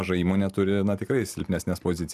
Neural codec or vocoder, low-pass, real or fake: none; 14.4 kHz; real